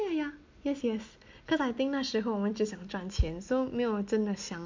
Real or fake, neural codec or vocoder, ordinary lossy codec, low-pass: real; none; MP3, 48 kbps; 7.2 kHz